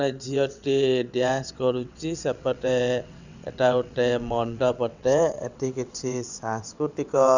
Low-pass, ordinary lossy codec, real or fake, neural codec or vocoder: 7.2 kHz; none; fake; vocoder, 22.05 kHz, 80 mel bands, WaveNeXt